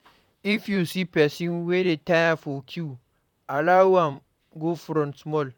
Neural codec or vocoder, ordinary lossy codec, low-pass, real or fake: vocoder, 44.1 kHz, 128 mel bands, Pupu-Vocoder; none; 19.8 kHz; fake